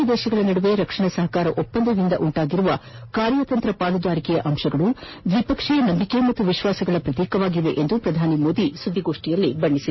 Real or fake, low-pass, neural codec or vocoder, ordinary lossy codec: real; 7.2 kHz; none; MP3, 24 kbps